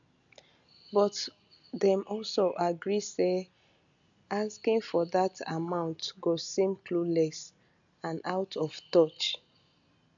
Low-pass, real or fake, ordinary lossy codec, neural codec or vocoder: 7.2 kHz; real; none; none